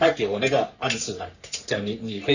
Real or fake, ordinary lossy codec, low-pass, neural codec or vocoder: fake; none; 7.2 kHz; codec, 44.1 kHz, 3.4 kbps, Pupu-Codec